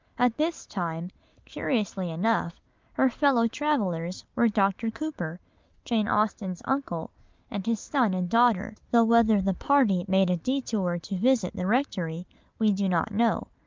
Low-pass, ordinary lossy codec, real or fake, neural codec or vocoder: 7.2 kHz; Opus, 24 kbps; real; none